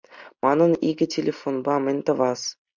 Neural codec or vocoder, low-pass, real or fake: none; 7.2 kHz; real